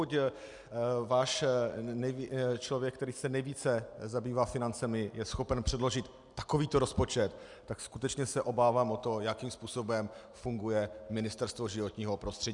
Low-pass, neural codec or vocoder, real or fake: 10.8 kHz; none; real